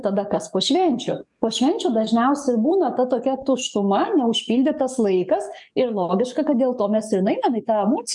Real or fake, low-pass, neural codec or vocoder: fake; 10.8 kHz; codec, 44.1 kHz, 7.8 kbps, DAC